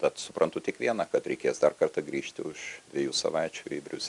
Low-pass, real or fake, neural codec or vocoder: 10.8 kHz; real; none